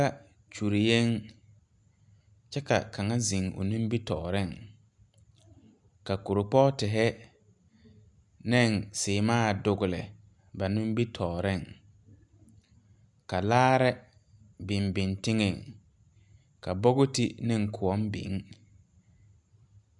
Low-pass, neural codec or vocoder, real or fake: 10.8 kHz; none; real